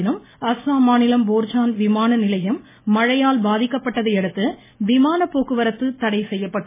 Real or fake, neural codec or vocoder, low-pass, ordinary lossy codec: real; none; 3.6 kHz; MP3, 16 kbps